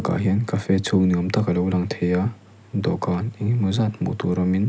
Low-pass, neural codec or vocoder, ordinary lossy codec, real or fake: none; none; none; real